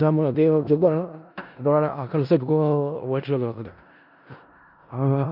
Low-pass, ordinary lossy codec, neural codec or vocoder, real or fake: 5.4 kHz; none; codec, 16 kHz in and 24 kHz out, 0.4 kbps, LongCat-Audio-Codec, four codebook decoder; fake